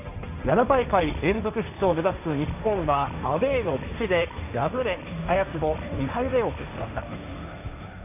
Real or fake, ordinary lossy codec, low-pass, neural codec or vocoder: fake; none; 3.6 kHz; codec, 16 kHz, 1.1 kbps, Voila-Tokenizer